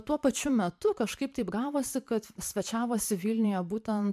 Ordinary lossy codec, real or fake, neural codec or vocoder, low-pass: MP3, 96 kbps; fake; vocoder, 44.1 kHz, 128 mel bands every 512 samples, BigVGAN v2; 14.4 kHz